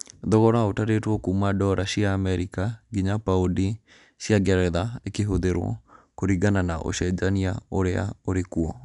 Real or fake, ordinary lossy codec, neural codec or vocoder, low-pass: real; none; none; 10.8 kHz